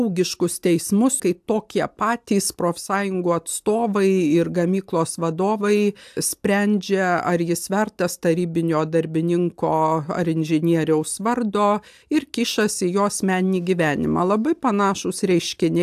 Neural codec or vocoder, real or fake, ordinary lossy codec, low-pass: none; real; AAC, 96 kbps; 14.4 kHz